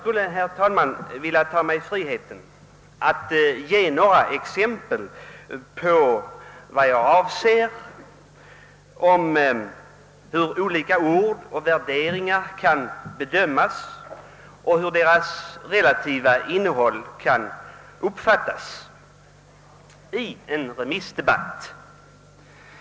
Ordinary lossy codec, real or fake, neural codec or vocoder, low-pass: none; real; none; none